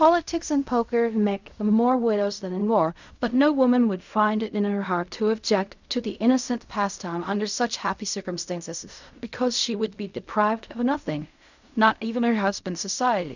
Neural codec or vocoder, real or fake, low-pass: codec, 16 kHz in and 24 kHz out, 0.4 kbps, LongCat-Audio-Codec, fine tuned four codebook decoder; fake; 7.2 kHz